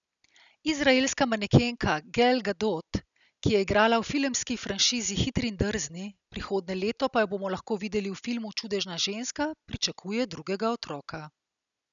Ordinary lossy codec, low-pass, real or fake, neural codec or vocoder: none; 7.2 kHz; real; none